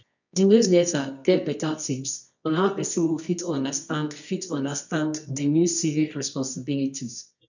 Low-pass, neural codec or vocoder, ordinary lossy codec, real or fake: 7.2 kHz; codec, 24 kHz, 0.9 kbps, WavTokenizer, medium music audio release; none; fake